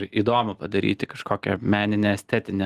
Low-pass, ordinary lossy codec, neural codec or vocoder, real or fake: 14.4 kHz; Opus, 24 kbps; none; real